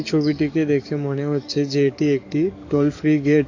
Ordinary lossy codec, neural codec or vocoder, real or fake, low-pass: AAC, 48 kbps; codec, 44.1 kHz, 7.8 kbps, DAC; fake; 7.2 kHz